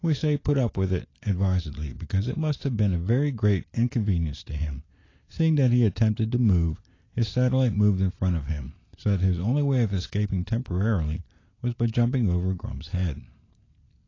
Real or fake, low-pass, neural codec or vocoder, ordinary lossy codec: real; 7.2 kHz; none; AAC, 32 kbps